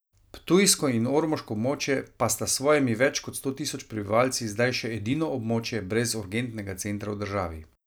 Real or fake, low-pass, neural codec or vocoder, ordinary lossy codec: real; none; none; none